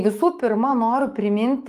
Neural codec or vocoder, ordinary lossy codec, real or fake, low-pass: autoencoder, 48 kHz, 128 numbers a frame, DAC-VAE, trained on Japanese speech; Opus, 24 kbps; fake; 14.4 kHz